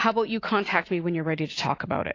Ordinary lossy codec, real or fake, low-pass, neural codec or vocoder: AAC, 32 kbps; real; 7.2 kHz; none